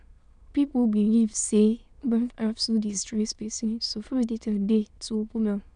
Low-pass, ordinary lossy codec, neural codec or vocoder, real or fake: 9.9 kHz; none; autoencoder, 22.05 kHz, a latent of 192 numbers a frame, VITS, trained on many speakers; fake